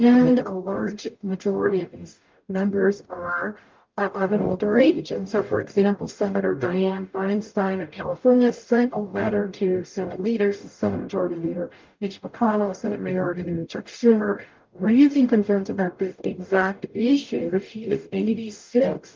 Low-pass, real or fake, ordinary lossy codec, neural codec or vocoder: 7.2 kHz; fake; Opus, 24 kbps; codec, 44.1 kHz, 0.9 kbps, DAC